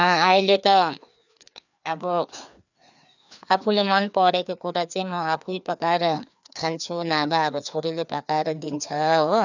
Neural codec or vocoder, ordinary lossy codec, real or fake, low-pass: codec, 16 kHz, 2 kbps, FreqCodec, larger model; none; fake; 7.2 kHz